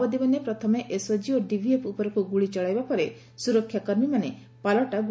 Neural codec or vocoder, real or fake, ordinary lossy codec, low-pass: none; real; none; none